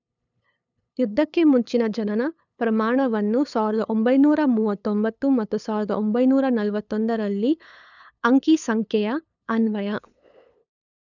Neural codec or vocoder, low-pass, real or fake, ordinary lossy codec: codec, 16 kHz, 8 kbps, FunCodec, trained on LibriTTS, 25 frames a second; 7.2 kHz; fake; none